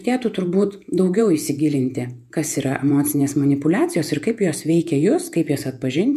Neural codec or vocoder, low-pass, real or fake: vocoder, 48 kHz, 128 mel bands, Vocos; 14.4 kHz; fake